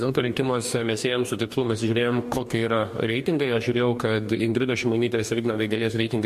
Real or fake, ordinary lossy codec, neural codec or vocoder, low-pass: fake; MP3, 64 kbps; codec, 44.1 kHz, 2.6 kbps, DAC; 14.4 kHz